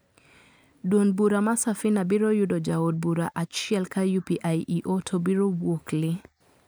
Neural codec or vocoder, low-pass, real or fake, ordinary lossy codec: none; none; real; none